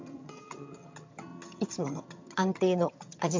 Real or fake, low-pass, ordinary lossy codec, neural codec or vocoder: fake; 7.2 kHz; MP3, 64 kbps; vocoder, 22.05 kHz, 80 mel bands, HiFi-GAN